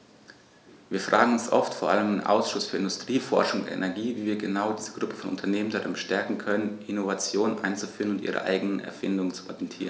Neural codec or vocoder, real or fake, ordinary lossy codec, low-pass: none; real; none; none